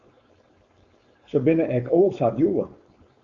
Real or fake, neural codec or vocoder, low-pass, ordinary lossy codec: fake; codec, 16 kHz, 4.8 kbps, FACodec; 7.2 kHz; Opus, 64 kbps